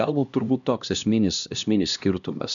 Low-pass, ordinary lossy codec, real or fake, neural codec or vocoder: 7.2 kHz; MP3, 96 kbps; fake; codec, 16 kHz, 1 kbps, X-Codec, HuBERT features, trained on LibriSpeech